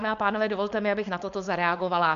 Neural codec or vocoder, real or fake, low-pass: codec, 16 kHz, 4.8 kbps, FACodec; fake; 7.2 kHz